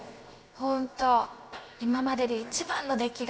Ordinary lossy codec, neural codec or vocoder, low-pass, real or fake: none; codec, 16 kHz, 0.7 kbps, FocalCodec; none; fake